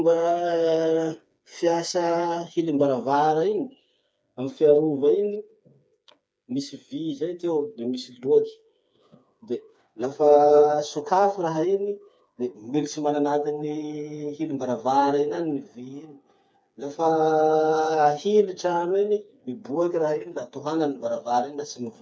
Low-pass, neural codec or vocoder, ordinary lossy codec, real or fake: none; codec, 16 kHz, 4 kbps, FreqCodec, smaller model; none; fake